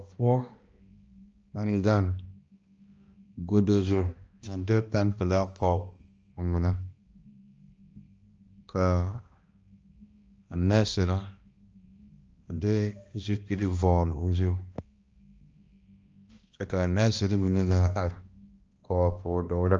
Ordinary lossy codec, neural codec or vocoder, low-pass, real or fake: Opus, 24 kbps; codec, 16 kHz, 1 kbps, X-Codec, HuBERT features, trained on balanced general audio; 7.2 kHz; fake